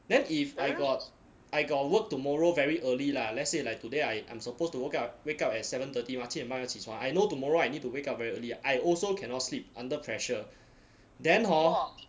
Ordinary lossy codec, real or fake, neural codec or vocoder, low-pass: none; real; none; none